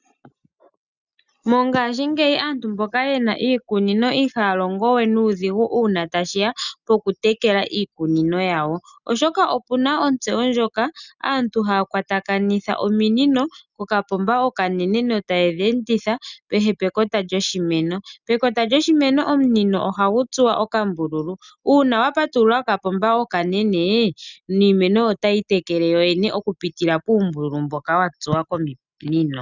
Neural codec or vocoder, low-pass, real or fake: none; 7.2 kHz; real